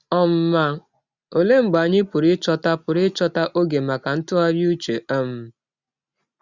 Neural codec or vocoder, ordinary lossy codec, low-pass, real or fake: none; Opus, 64 kbps; 7.2 kHz; real